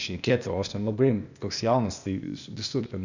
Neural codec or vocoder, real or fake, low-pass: codec, 16 kHz, 0.8 kbps, ZipCodec; fake; 7.2 kHz